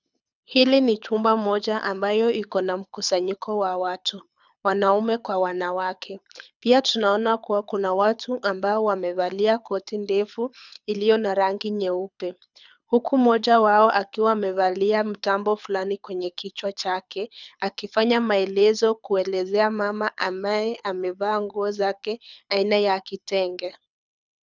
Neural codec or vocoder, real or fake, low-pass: codec, 24 kHz, 6 kbps, HILCodec; fake; 7.2 kHz